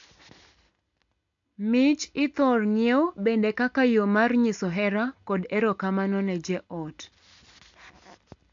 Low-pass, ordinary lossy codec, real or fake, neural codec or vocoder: 7.2 kHz; AAC, 64 kbps; real; none